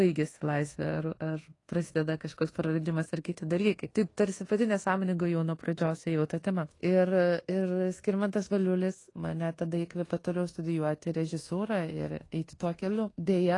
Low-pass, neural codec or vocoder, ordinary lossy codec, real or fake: 10.8 kHz; codec, 24 kHz, 1.2 kbps, DualCodec; AAC, 32 kbps; fake